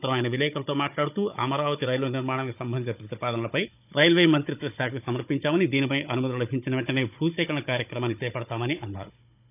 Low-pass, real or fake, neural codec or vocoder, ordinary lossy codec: 3.6 kHz; fake; codec, 16 kHz, 16 kbps, FunCodec, trained on Chinese and English, 50 frames a second; none